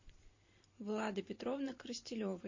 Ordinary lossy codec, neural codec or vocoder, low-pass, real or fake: MP3, 32 kbps; vocoder, 44.1 kHz, 128 mel bands every 512 samples, BigVGAN v2; 7.2 kHz; fake